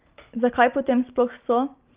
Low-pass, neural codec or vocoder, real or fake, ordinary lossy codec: 3.6 kHz; none; real; Opus, 24 kbps